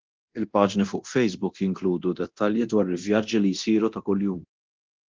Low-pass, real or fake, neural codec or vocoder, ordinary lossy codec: 7.2 kHz; fake; codec, 24 kHz, 0.9 kbps, DualCodec; Opus, 16 kbps